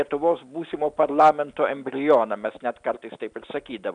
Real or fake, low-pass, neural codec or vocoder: real; 9.9 kHz; none